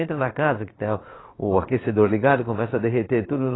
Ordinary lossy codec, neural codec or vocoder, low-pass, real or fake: AAC, 16 kbps; vocoder, 22.05 kHz, 80 mel bands, Vocos; 7.2 kHz; fake